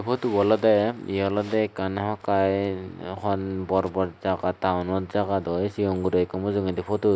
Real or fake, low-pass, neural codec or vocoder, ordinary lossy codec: real; none; none; none